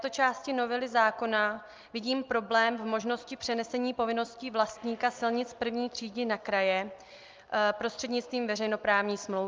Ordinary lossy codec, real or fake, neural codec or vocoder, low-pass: Opus, 24 kbps; real; none; 7.2 kHz